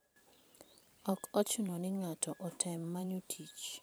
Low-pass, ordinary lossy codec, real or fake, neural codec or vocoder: none; none; fake; vocoder, 44.1 kHz, 128 mel bands every 512 samples, BigVGAN v2